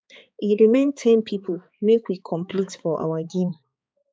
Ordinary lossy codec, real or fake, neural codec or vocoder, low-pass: none; fake; codec, 16 kHz, 4 kbps, X-Codec, HuBERT features, trained on balanced general audio; none